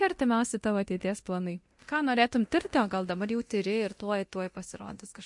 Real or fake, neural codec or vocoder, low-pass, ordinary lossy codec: fake; codec, 24 kHz, 0.9 kbps, DualCodec; 10.8 kHz; MP3, 48 kbps